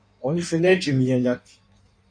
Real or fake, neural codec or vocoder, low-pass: fake; codec, 16 kHz in and 24 kHz out, 1.1 kbps, FireRedTTS-2 codec; 9.9 kHz